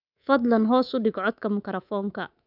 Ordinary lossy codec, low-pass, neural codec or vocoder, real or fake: none; 5.4 kHz; codec, 24 kHz, 3.1 kbps, DualCodec; fake